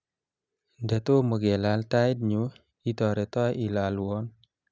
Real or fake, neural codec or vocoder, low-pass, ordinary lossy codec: real; none; none; none